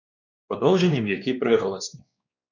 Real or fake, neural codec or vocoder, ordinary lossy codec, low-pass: fake; codec, 16 kHz, 2 kbps, X-Codec, WavLM features, trained on Multilingual LibriSpeech; MP3, 64 kbps; 7.2 kHz